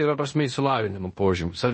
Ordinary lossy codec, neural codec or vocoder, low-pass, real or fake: MP3, 32 kbps; codec, 16 kHz in and 24 kHz out, 0.9 kbps, LongCat-Audio-Codec, fine tuned four codebook decoder; 10.8 kHz; fake